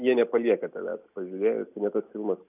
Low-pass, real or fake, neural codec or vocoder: 3.6 kHz; real; none